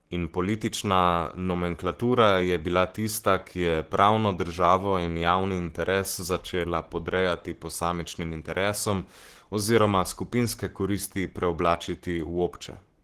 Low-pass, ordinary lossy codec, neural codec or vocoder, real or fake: 14.4 kHz; Opus, 16 kbps; codec, 44.1 kHz, 7.8 kbps, Pupu-Codec; fake